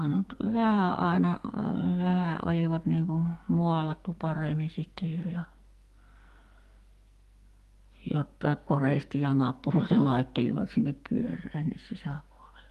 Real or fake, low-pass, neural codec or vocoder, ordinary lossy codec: fake; 14.4 kHz; codec, 44.1 kHz, 2.6 kbps, SNAC; Opus, 32 kbps